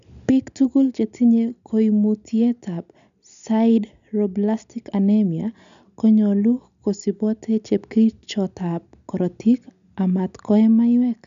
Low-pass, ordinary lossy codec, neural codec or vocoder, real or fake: 7.2 kHz; none; none; real